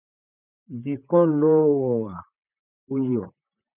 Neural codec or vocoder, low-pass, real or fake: vocoder, 44.1 kHz, 128 mel bands, Pupu-Vocoder; 3.6 kHz; fake